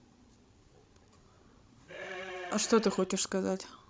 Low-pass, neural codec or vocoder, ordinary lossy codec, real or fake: none; codec, 16 kHz, 16 kbps, FreqCodec, larger model; none; fake